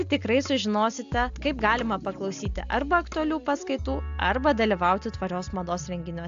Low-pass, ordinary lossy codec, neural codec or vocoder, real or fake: 7.2 kHz; AAC, 64 kbps; none; real